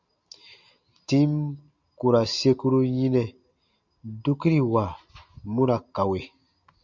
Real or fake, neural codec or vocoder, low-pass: real; none; 7.2 kHz